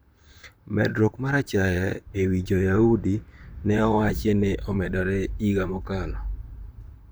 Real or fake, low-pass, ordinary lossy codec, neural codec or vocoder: fake; none; none; vocoder, 44.1 kHz, 128 mel bands, Pupu-Vocoder